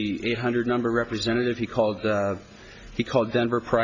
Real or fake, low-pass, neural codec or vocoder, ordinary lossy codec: real; 7.2 kHz; none; AAC, 48 kbps